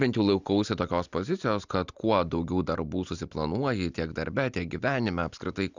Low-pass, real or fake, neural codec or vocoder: 7.2 kHz; real; none